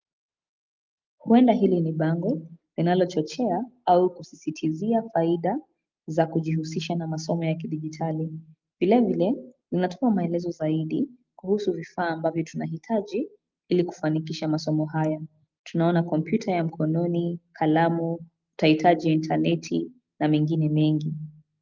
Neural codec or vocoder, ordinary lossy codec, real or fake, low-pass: none; Opus, 24 kbps; real; 7.2 kHz